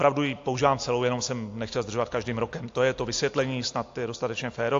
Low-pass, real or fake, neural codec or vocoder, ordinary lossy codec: 7.2 kHz; real; none; AAC, 48 kbps